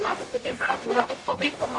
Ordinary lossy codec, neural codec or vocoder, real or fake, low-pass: AAC, 48 kbps; codec, 44.1 kHz, 0.9 kbps, DAC; fake; 10.8 kHz